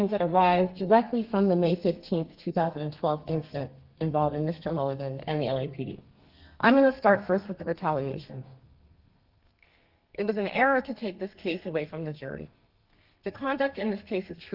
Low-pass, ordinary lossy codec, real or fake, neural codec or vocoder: 5.4 kHz; Opus, 32 kbps; fake; codec, 32 kHz, 1.9 kbps, SNAC